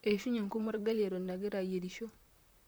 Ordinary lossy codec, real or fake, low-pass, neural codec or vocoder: none; fake; none; vocoder, 44.1 kHz, 128 mel bands, Pupu-Vocoder